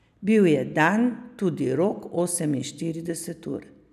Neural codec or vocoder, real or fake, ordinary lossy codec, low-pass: none; real; none; 14.4 kHz